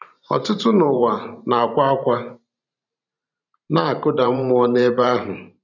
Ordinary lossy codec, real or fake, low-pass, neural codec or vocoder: none; fake; 7.2 kHz; vocoder, 44.1 kHz, 128 mel bands every 512 samples, BigVGAN v2